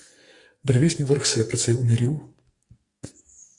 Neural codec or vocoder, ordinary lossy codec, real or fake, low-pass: codec, 44.1 kHz, 2.6 kbps, SNAC; Opus, 64 kbps; fake; 10.8 kHz